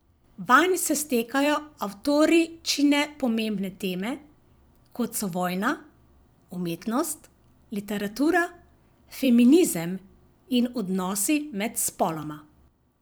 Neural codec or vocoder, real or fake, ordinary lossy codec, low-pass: vocoder, 44.1 kHz, 128 mel bands every 256 samples, BigVGAN v2; fake; none; none